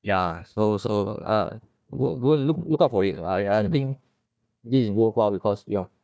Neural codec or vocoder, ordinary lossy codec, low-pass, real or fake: codec, 16 kHz, 1 kbps, FunCodec, trained on Chinese and English, 50 frames a second; none; none; fake